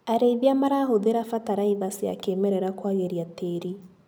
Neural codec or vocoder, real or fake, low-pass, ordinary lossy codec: none; real; none; none